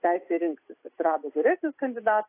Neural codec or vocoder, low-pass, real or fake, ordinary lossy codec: none; 3.6 kHz; real; AAC, 24 kbps